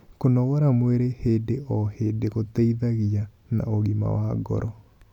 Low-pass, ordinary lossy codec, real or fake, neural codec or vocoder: 19.8 kHz; none; real; none